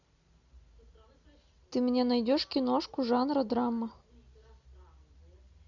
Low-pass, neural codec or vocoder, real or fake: 7.2 kHz; none; real